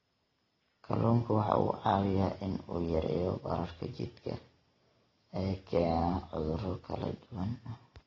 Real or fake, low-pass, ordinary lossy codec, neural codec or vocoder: real; 7.2 kHz; AAC, 24 kbps; none